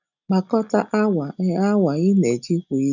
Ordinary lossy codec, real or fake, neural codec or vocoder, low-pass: none; real; none; 7.2 kHz